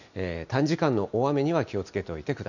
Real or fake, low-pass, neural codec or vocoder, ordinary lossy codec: real; 7.2 kHz; none; none